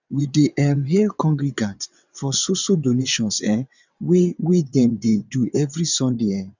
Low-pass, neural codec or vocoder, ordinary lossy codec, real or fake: 7.2 kHz; vocoder, 22.05 kHz, 80 mel bands, WaveNeXt; none; fake